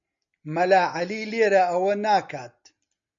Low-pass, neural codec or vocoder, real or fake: 7.2 kHz; none; real